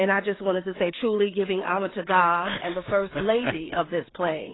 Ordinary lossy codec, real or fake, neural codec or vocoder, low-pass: AAC, 16 kbps; fake; codec, 16 kHz, 4 kbps, FreqCodec, larger model; 7.2 kHz